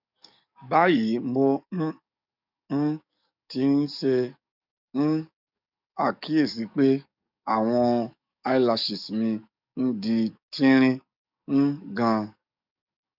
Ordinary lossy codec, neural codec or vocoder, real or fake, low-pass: none; codec, 44.1 kHz, 7.8 kbps, DAC; fake; 5.4 kHz